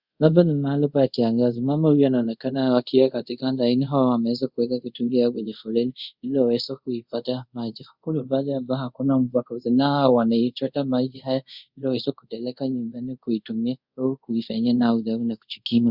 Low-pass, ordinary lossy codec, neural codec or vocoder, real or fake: 5.4 kHz; Opus, 64 kbps; codec, 24 kHz, 0.5 kbps, DualCodec; fake